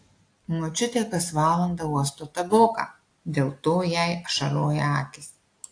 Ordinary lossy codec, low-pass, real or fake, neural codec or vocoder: AAC, 48 kbps; 9.9 kHz; real; none